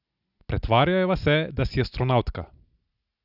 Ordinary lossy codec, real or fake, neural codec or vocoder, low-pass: Opus, 64 kbps; real; none; 5.4 kHz